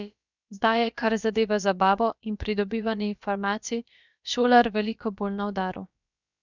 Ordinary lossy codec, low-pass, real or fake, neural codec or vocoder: none; 7.2 kHz; fake; codec, 16 kHz, about 1 kbps, DyCAST, with the encoder's durations